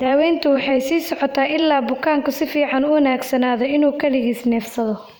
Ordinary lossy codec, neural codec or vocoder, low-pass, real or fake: none; vocoder, 44.1 kHz, 128 mel bands every 512 samples, BigVGAN v2; none; fake